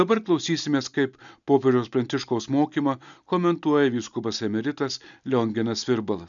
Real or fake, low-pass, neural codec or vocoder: real; 7.2 kHz; none